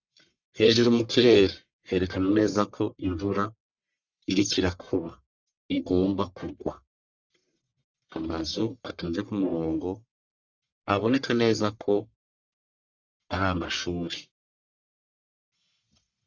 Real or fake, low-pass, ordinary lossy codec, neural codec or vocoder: fake; 7.2 kHz; Opus, 64 kbps; codec, 44.1 kHz, 1.7 kbps, Pupu-Codec